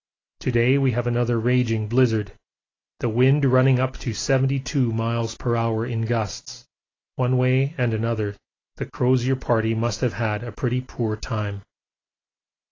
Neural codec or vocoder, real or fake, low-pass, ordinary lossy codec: none; real; 7.2 kHz; AAC, 32 kbps